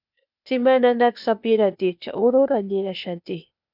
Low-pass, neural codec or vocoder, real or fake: 5.4 kHz; codec, 16 kHz, 0.8 kbps, ZipCodec; fake